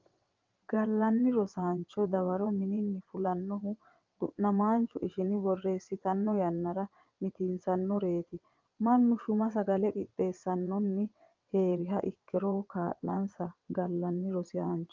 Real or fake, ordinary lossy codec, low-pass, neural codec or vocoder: fake; Opus, 32 kbps; 7.2 kHz; vocoder, 22.05 kHz, 80 mel bands, WaveNeXt